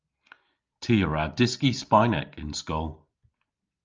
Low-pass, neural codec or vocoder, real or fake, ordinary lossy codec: 7.2 kHz; none; real; Opus, 24 kbps